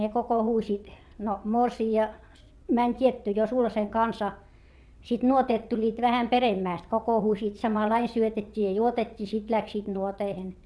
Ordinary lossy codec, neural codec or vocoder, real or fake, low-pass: none; vocoder, 22.05 kHz, 80 mel bands, WaveNeXt; fake; none